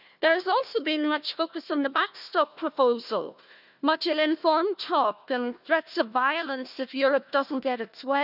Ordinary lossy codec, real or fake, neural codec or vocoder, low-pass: none; fake; codec, 16 kHz, 1 kbps, FunCodec, trained on Chinese and English, 50 frames a second; 5.4 kHz